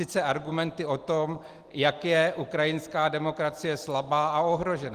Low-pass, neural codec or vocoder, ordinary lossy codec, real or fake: 14.4 kHz; none; Opus, 24 kbps; real